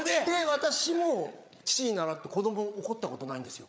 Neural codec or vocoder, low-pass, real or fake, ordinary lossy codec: codec, 16 kHz, 8 kbps, FreqCodec, larger model; none; fake; none